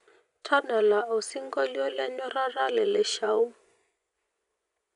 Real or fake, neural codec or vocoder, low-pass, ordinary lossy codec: fake; vocoder, 24 kHz, 100 mel bands, Vocos; 10.8 kHz; none